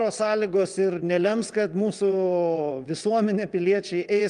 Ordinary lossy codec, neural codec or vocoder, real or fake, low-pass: Opus, 32 kbps; vocoder, 22.05 kHz, 80 mel bands, WaveNeXt; fake; 9.9 kHz